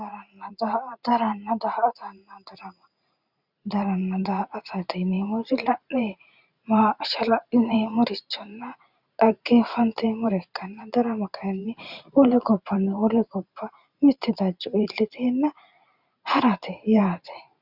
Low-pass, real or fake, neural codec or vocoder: 5.4 kHz; fake; vocoder, 44.1 kHz, 128 mel bands every 512 samples, BigVGAN v2